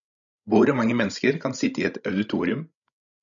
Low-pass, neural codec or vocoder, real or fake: 7.2 kHz; codec, 16 kHz, 16 kbps, FreqCodec, larger model; fake